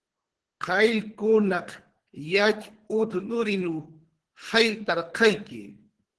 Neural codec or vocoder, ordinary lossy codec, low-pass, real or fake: codec, 24 kHz, 3 kbps, HILCodec; Opus, 16 kbps; 10.8 kHz; fake